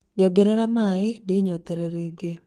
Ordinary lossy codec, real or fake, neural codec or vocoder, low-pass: Opus, 24 kbps; fake; codec, 32 kHz, 1.9 kbps, SNAC; 14.4 kHz